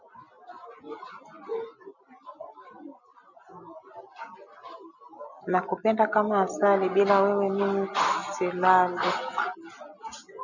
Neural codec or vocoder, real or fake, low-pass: none; real; 7.2 kHz